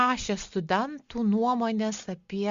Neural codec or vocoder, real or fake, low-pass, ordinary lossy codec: none; real; 7.2 kHz; Opus, 64 kbps